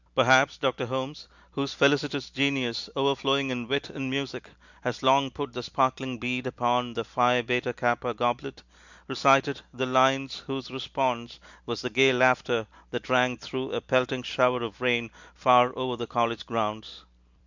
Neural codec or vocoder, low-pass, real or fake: none; 7.2 kHz; real